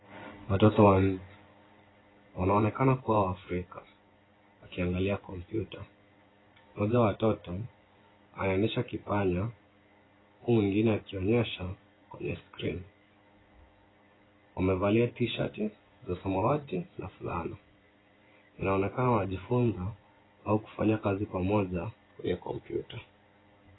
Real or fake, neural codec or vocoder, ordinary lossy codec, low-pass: fake; vocoder, 24 kHz, 100 mel bands, Vocos; AAC, 16 kbps; 7.2 kHz